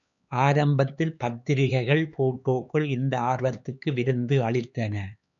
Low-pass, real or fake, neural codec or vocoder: 7.2 kHz; fake; codec, 16 kHz, 4 kbps, X-Codec, HuBERT features, trained on LibriSpeech